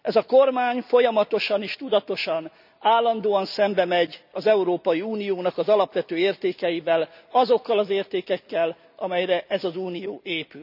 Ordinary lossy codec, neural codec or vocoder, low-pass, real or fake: none; none; 5.4 kHz; real